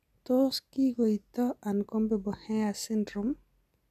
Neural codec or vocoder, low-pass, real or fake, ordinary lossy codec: none; 14.4 kHz; real; none